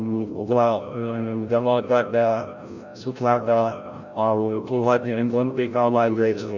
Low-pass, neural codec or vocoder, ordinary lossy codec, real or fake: 7.2 kHz; codec, 16 kHz, 0.5 kbps, FreqCodec, larger model; none; fake